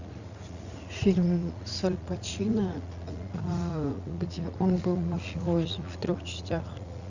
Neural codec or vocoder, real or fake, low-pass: vocoder, 44.1 kHz, 80 mel bands, Vocos; fake; 7.2 kHz